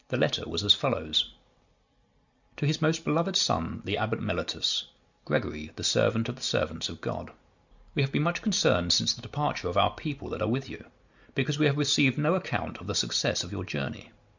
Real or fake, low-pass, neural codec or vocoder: real; 7.2 kHz; none